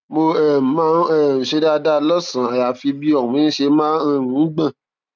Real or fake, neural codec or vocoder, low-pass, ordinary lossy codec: real; none; 7.2 kHz; none